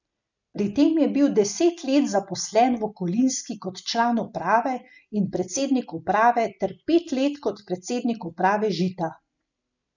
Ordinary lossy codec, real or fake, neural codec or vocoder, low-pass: none; real; none; 7.2 kHz